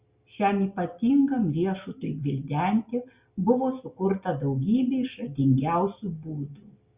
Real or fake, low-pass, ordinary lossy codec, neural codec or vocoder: real; 3.6 kHz; Opus, 64 kbps; none